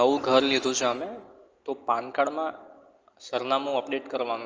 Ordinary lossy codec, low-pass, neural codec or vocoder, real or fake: Opus, 24 kbps; 7.2 kHz; none; real